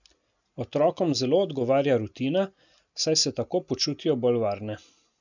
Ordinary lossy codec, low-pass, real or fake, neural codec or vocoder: none; 7.2 kHz; real; none